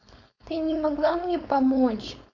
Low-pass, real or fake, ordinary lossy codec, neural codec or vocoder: 7.2 kHz; fake; none; codec, 16 kHz, 4.8 kbps, FACodec